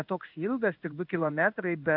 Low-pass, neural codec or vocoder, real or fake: 5.4 kHz; codec, 16 kHz in and 24 kHz out, 1 kbps, XY-Tokenizer; fake